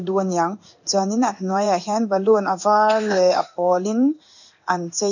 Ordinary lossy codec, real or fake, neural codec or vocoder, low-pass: none; fake; codec, 16 kHz in and 24 kHz out, 1 kbps, XY-Tokenizer; 7.2 kHz